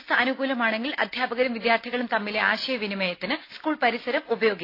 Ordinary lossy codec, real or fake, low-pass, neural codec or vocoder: AAC, 32 kbps; real; 5.4 kHz; none